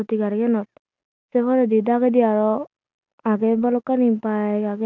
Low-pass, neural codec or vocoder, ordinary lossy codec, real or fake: 7.2 kHz; none; none; real